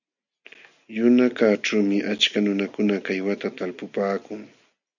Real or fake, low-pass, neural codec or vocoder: real; 7.2 kHz; none